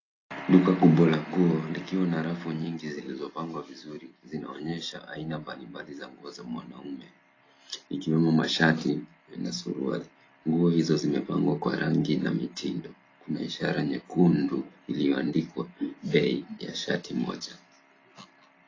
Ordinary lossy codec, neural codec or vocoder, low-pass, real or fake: AAC, 32 kbps; none; 7.2 kHz; real